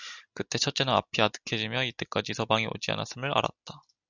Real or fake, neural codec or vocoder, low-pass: real; none; 7.2 kHz